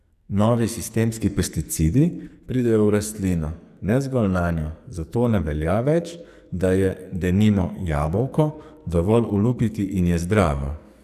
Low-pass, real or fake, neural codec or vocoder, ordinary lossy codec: 14.4 kHz; fake; codec, 44.1 kHz, 2.6 kbps, SNAC; none